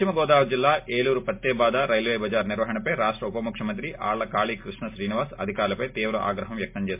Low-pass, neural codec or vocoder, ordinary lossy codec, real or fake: 3.6 kHz; none; MP3, 32 kbps; real